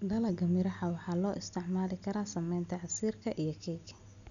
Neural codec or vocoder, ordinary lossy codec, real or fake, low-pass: none; none; real; 7.2 kHz